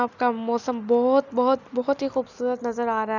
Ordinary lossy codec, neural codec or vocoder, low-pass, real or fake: none; none; 7.2 kHz; real